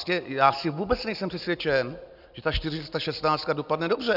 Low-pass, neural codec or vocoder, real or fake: 5.4 kHz; none; real